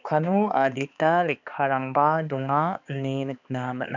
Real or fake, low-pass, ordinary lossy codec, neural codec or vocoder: fake; 7.2 kHz; none; codec, 16 kHz, 2 kbps, X-Codec, HuBERT features, trained on balanced general audio